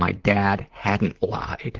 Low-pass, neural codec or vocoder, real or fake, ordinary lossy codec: 7.2 kHz; vocoder, 44.1 kHz, 128 mel bands, Pupu-Vocoder; fake; Opus, 16 kbps